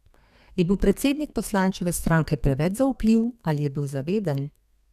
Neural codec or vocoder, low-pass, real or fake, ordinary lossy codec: codec, 32 kHz, 1.9 kbps, SNAC; 14.4 kHz; fake; none